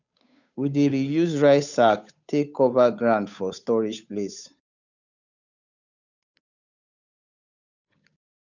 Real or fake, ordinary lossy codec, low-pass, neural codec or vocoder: fake; none; 7.2 kHz; codec, 16 kHz, 8 kbps, FunCodec, trained on Chinese and English, 25 frames a second